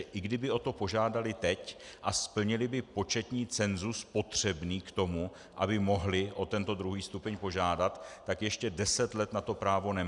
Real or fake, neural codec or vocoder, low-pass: real; none; 10.8 kHz